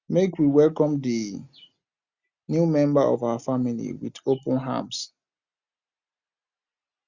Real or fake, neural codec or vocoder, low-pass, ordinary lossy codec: real; none; 7.2 kHz; Opus, 64 kbps